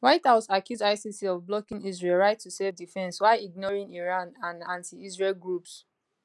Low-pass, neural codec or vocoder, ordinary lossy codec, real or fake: none; none; none; real